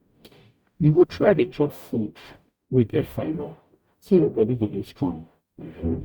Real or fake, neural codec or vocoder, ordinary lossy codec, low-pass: fake; codec, 44.1 kHz, 0.9 kbps, DAC; Opus, 64 kbps; 19.8 kHz